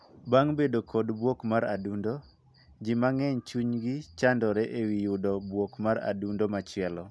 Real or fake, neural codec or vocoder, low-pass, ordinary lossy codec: real; none; none; none